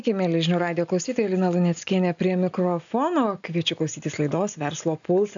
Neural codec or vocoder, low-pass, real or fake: none; 7.2 kHz; real